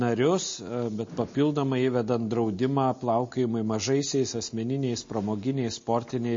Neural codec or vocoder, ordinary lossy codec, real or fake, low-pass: none; MP3, 32 kbps; real; 7.2 kHz